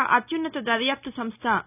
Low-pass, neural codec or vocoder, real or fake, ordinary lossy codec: 3.6 kHz; none; real; none